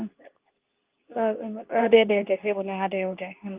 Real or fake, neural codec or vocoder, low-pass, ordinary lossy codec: fake; codec, 24 kHz, 0.9 kbps, WavTokenizer, medium speech release version 2; 3.6 kHz; Opus, 24 kbps